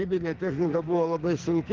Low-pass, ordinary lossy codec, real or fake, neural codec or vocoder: 7.2 kHz; Opus, 16 kbps; fake; codec, 44.1 kHz, 2.6 kbps, SNAC